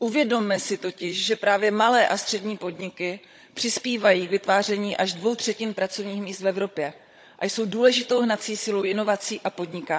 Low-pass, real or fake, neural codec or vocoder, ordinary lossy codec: none; fake; codec, 16 kHz, 16 kbps, FunCodec, trained on Chinese and English, 50 frames a second; none